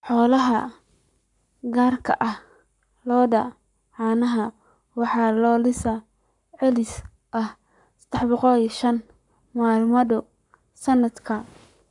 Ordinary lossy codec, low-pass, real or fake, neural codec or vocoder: none; 10.8 kHz; fake; codec, 44.1 kHz, 7.8 kbps, Pupu-Codec